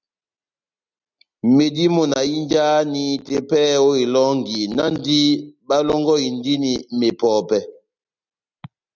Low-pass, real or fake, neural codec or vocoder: 7.2 kHz; real; none